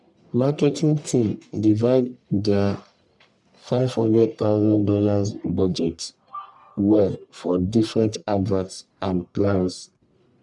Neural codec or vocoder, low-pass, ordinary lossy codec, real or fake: codec, 44.1 kHz, 1.7 kbps, Pupu-Codec; 10.8 kHz; none; fake